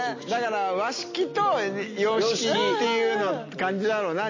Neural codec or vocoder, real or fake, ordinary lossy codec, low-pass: none; real; MP3, 32 kbps; 7.2 kHz